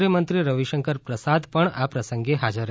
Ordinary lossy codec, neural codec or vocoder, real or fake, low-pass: none; none; real; none